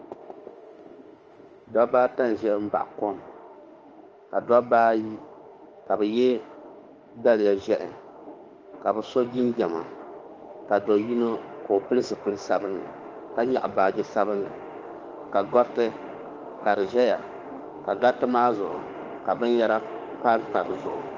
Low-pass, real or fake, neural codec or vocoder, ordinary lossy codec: 7.2 kHz; fake; autoencoder, 48 kHz, 32 numbers a frame, DAC-VAE, trained on Japanese speech; Opus, 24 kbps